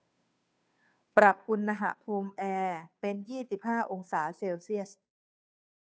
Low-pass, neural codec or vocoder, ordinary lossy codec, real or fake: none; codec, 16 kHz, 2 kbps, FunCodec, trained on Chinese and English, 25 frames a second; none; fake